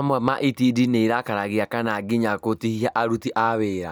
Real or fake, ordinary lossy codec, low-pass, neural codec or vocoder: real; none; none; none